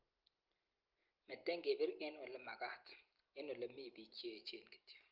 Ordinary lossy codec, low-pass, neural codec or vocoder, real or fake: Opus, 32 kbps; 5.4 kHz; none; real